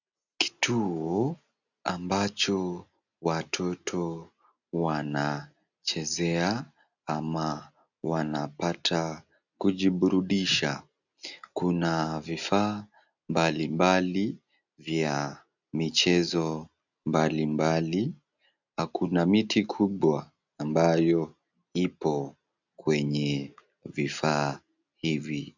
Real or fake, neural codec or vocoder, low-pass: real; none; 7.2 kHz